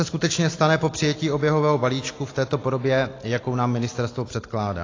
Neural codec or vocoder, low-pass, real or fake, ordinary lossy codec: none; 7.2 kHz; real; AAC, 32 kbps